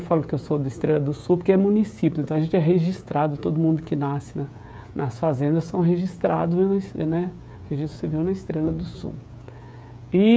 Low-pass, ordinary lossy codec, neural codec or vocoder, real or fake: none; none; codec, 16 kHz, 16 kbps, FreqCodec, smaller model; fake